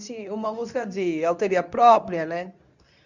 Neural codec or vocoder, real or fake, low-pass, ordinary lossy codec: codec, 24 kHz, 0.9 kbps, WavTokenizer, medium speech release version 2; fake; 7.2 kHz; none